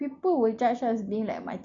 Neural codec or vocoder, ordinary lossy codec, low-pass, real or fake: none; MP3, 48 kbps; 7.2 kHz; real